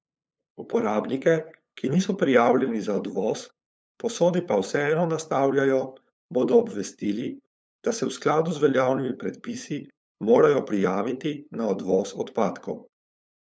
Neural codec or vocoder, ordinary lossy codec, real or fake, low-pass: codec, 16 kHz, 8 kbps, FunCodec, trained on LibriTTS, 25 frames a second; none; fake; none